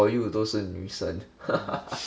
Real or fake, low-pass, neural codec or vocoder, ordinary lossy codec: real; none; none; none